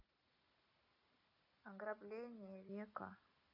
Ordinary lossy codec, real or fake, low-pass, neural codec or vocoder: MP3, 48 kbps; real; 5.4 kHz; none